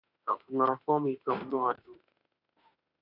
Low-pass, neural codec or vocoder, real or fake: 5.4 kHz; autoencoder, 48 kHz, 32 numbers a frame, DAC-VAE, trained on Japanese speech; fake